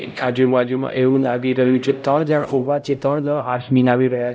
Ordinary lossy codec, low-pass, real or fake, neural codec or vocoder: none; none; fake; codec, 16 kHz, 0.5 kbps, X-Codec, HuBERT features, trained on LibriSpeech